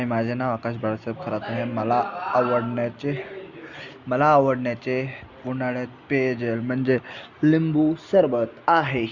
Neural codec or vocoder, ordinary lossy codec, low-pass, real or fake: none; Opus, 64 kbps; 7.2 kHz; real